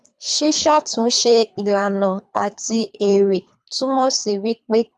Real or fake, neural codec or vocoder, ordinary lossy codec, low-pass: fake; codec, 24 kHz, 3 kbps, HILCodec; none; none